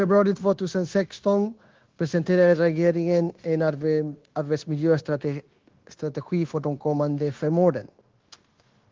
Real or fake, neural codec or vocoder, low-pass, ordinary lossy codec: fake; codec, 16 kHz, 0.9 kbps, LongCat-Audio-Codec; 7.2 kHz; Opus, 16 kbps